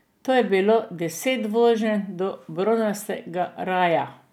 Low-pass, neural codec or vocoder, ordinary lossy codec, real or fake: 19.8 kHz; none; none; real